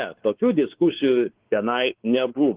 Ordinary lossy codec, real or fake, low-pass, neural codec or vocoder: Opus, 16 kbps; fake; 3.6 kHz; codec, 16 kHz, 4 kbps, X-Codec, WavLM features, trained on Multilingual LibriSpeech